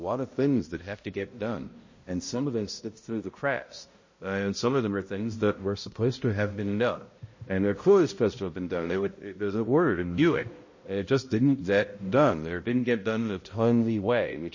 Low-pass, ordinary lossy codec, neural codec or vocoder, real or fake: 7.2 kHz; MP3, 32 kbps; codec, 16 kHz, 0.5 kbps, X-Codec, HuBERT features, trained on balanced general audio; fake